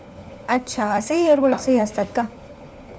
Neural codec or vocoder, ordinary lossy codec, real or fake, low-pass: codec, 16 kHz, 4 kbps, FunCodec, trained on LibriTTS, 50 frames a second; none; fake; none